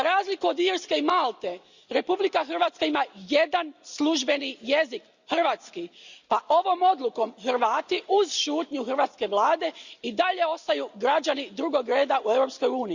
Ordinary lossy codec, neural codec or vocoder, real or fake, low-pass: Opus, 64 kbps; none; real; 7.2 kHz